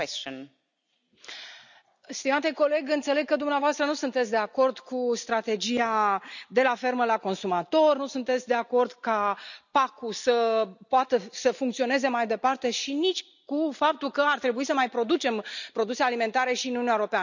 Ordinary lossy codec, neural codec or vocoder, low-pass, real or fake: none; none; 7.2 kHz; real